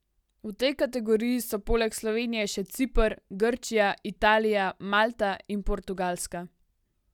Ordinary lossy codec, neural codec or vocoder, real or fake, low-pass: none; none; real; 19.8 kHz